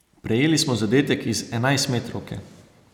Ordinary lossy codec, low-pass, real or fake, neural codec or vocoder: none; 19.8 kHz; real; none